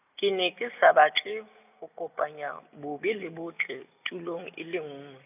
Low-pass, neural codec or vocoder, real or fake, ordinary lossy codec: 3.6 kHz; none; real; none